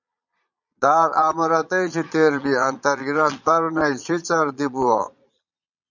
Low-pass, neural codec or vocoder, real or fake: 7.2 kHz; vocoder, 22.05 kHz, 80 mel bands, Vocos; fake